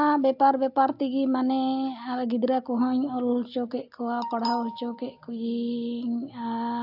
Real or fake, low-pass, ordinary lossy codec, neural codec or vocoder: real; 5.4 kHz; AAC, 48 kbps; none